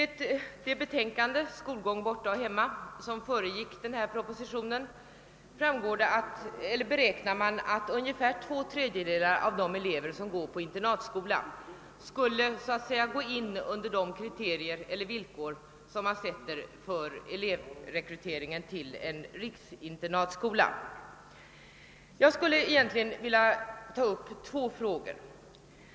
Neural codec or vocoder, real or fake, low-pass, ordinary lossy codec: none; real; none; none